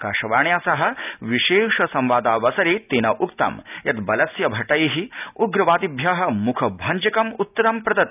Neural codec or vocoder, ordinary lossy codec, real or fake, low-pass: none; none; real; 3.6 kHz